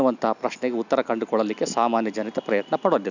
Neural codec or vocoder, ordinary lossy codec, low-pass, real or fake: none; none; 7.2 kHz; real